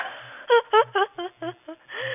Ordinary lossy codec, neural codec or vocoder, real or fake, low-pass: none; none; real; 3.6 kHz